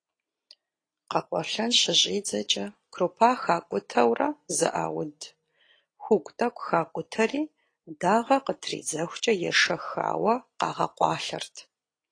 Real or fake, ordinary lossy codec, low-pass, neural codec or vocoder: real; AAC, 32 kbps; 9.9 kHz; none